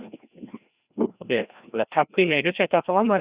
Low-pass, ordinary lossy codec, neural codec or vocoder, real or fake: 3.6 kHz; Opus, 64 kbps; codec, 16 kHz, 1 kbps, FreqCodec, larger model; fake